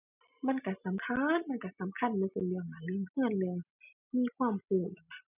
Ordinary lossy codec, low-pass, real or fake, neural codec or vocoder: none; 3.6 kHz; real; none